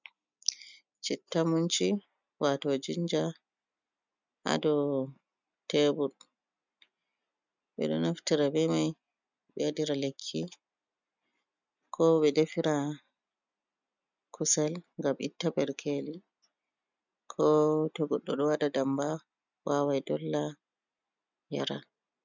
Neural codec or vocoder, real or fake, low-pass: none; real; 7.2 kHz